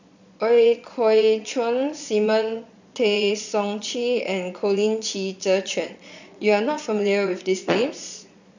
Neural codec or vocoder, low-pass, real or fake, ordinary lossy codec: vocoder, 22.05 kHz, 80 mel bands, Vocos; 7.2 kHz; fake; none